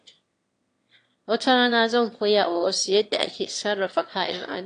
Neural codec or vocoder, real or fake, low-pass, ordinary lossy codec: autoencoder, 22.05 kHz, a latent of 192 numbers a frame, VITS, trained on one speaker; fake; 9.9 kHz; AAC, 48 kbps